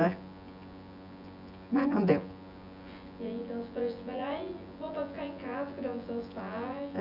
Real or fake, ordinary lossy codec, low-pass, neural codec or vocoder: fake; none; 5.4 kHz; vocoder, 24 kHz, 100 mel bands, Vocos